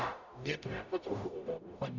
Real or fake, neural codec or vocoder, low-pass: fake; codec, 44.1 kHz, 0.9 kbps, DAC; 7.2 kHz